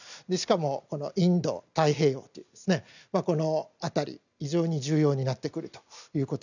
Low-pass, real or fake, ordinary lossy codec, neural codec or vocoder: 7.2 kHz; real; none; none